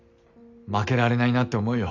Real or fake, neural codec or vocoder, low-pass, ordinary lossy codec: real; none; 7.2 kHz; none